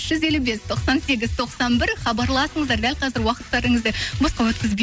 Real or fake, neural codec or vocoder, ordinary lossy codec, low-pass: real; none; none; none